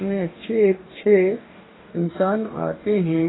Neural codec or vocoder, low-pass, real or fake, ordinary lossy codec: codec, 44.1 kHz, 2.6 kbps, DAC; 7.2 kHz; fake; AAC, 16 kbps